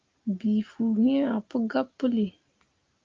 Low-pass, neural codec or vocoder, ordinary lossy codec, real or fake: 7.2 kHz; none; Opus, 24 kbps; real